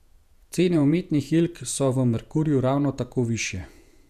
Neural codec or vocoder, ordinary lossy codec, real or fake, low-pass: vocoder, 48 kHz, 128 mel bands, Vocos; none; fake; 14.4 kHz